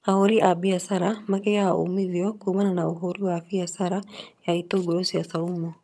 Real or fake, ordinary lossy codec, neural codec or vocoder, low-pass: fake; none; vocoder, 22.05 kHz, 80 mel bands, HiFi-GAN; none